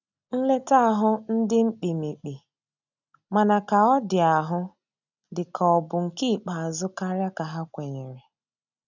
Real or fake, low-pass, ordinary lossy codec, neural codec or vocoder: real; 7.2 kHz; none; none